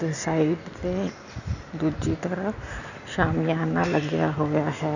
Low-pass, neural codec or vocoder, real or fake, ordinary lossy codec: 7.2 kHz; none; real; none